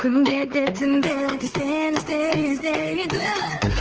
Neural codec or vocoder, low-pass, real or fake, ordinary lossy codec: codec, 16 kHz, 4 kbps, X-Codec, WavLM features, trained on Multilingual LibriSpeech; 7.2 kHz; fake; Opus, 16 kbps